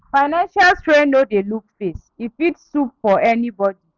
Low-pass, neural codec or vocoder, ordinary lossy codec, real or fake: 7.2 kHz; none; none; real